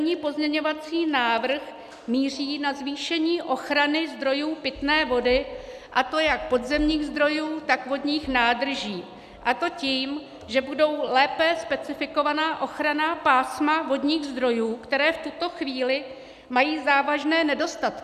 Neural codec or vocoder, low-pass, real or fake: none; 14.4 kHz; real